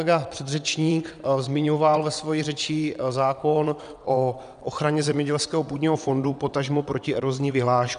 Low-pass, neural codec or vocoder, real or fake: 9.9 kHz; vocoder, 22.05 kHz, 80 mel bands, WaveNeXt; fake